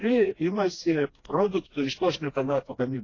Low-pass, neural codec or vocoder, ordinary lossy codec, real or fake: 7.2 kHz; codec, 16 kHz, 1 kbps, FreqCodec, smaller model; AAC, 32 kbps; fake